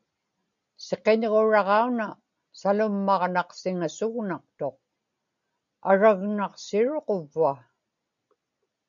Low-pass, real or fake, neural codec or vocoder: 7.2 kHz; real; none